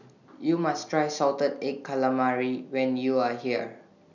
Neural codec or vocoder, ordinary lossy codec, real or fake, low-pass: none; none; real; 7.2 kHz